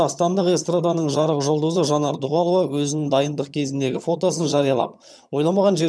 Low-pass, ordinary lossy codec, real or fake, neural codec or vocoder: none; none; fake; vocoder, 22.05 kHz, 80 mel bands, HiFi-GAN